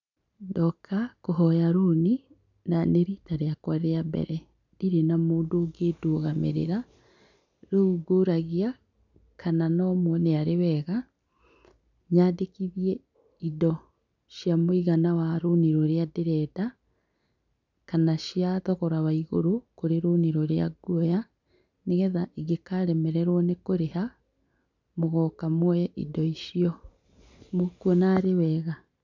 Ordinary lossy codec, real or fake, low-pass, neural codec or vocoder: none; real; 7.2 kHz; none